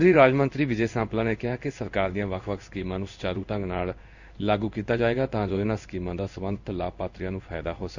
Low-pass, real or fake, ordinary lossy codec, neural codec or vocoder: 7.2 kHz; fake; none; codec, 16 kHz in and 24 kHz out, 1 kbps, XY-Tokenizer